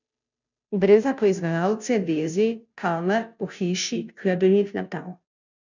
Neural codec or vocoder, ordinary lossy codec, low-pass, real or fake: codec, 16 kHz, 0.5 kbps, FunCodec, trained on Chinese and English, 25 frames a second; none; 7.2 kHz; fake